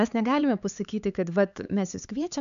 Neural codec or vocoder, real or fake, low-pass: codec, 16 kHz, 4 kbps, X-Codec, HuBERT features, trained on LibriSpeech; fake; 7.2 kHz